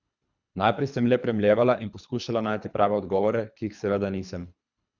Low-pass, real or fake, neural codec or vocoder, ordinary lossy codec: 7.2 kHz; fake; codec, 24 kHz, 3 kbps, HILCodec; none